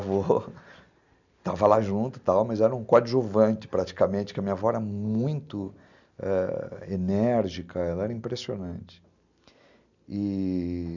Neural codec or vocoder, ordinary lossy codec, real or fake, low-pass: none; none; real; 7.2 kHz